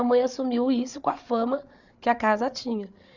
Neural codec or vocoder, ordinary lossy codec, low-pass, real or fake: codec, 16 kHz, 8 kbps, FreqCodec, larger model; none; 7.2 kHz; fake